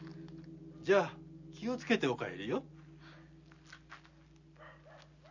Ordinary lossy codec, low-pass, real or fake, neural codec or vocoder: none; 7.2 kHz; real; none